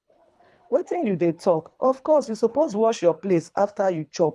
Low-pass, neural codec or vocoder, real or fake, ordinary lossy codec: none; codec, 24 kHz, 3 kbps, HILCodec; fake; none